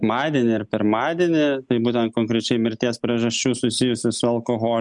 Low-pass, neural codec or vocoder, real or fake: 10.8 kHz; none; real